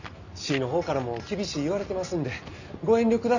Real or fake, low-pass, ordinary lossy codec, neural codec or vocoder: real; 7.2 kHz; none; none